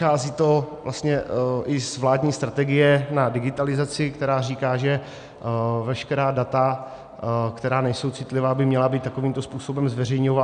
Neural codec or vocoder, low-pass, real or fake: none; 9.9 kHz; real